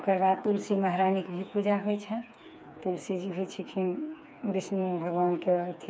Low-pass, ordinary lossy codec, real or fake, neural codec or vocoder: none; none; fake; codec, 16 kHz, 4 kbps, FreqCodec, smaller model